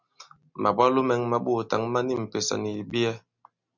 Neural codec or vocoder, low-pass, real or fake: none; 7.2 kHz; real